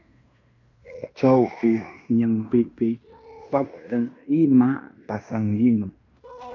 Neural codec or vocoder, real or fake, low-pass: codec, 16 kHz in and 24 kHz out, 0.9 kbps, LongCat-Audio-Codec, fine tuned four codebook decoder; fake; 7.2 kHz